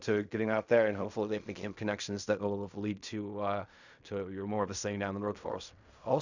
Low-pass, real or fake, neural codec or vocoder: 7.2 kHz; fake; codec, 16 kHz in and 24 kHz out, 0.4 kbps, LongCat-Audio-Codec, fine tuned four codebook decoder